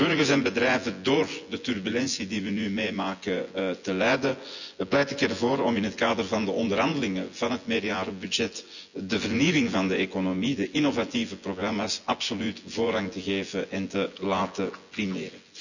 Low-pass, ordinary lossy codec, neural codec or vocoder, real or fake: 7.2 kHz; none; vocoder, 24 kHz, 100 mel bands, Vocos; fake